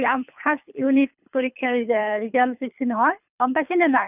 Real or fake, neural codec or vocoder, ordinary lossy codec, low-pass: fake; codec, 24 kHz, 3 kbps, HILCodec; none; 3.6 kHz